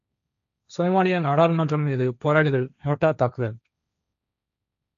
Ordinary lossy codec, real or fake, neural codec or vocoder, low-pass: AAC, 96 kbps; fake; codec, 16 kHz, 1.1 kbps, Voila-Tokenizer; 7.2 kHz